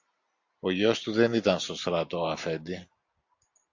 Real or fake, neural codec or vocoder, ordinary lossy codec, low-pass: real; none; AAC, 48 kbps; 7.2 kHz